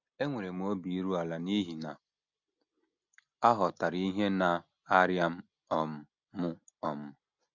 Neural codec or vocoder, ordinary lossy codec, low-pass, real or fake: none; none; 7.2 kHz; real